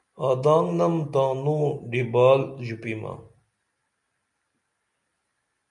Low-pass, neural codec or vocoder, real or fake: 10.8 kHz; none; real